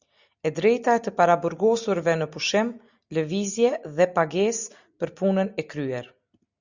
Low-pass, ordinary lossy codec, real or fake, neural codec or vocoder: 7.2 kHz; Opus, 64 kbps; real; none